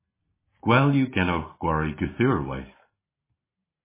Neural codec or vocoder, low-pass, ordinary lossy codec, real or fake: none; 3.6 kHz; MP3, 16 kbps; real